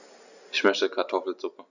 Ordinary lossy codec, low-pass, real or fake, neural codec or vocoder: none; 7.2 kHz; real; none